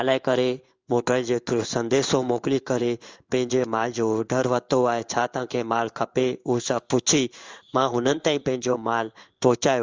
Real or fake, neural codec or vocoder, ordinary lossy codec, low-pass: fake; codec, 16 kHz in and 24 kHz out, 1 kbps, XY-Tokenizer; Opus, 32 kbps; 7.2 kHz